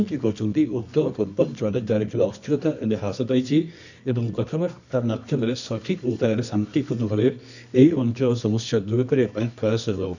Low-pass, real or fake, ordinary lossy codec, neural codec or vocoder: 7.2 kHz; fake; none; codec, 24 kHz, 0.9 kbps, WavTokenizer, medium music audio release